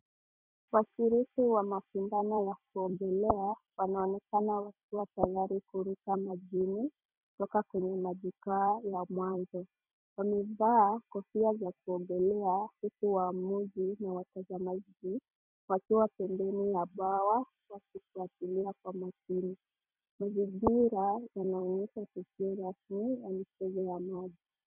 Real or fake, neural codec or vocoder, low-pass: fake; vocoder, 44.1 kHz, 128 mel bands every 256 samples, BigVGAN v2; 3.6 kHz